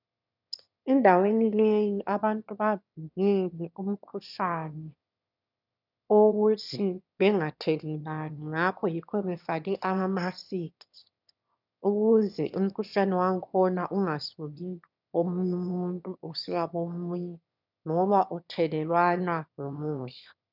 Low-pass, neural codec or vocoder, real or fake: 5.4 kHz; autoencoder, 22.05 kHz, a latent of 192 numbers a frame, VITS, trained on one speaker; fake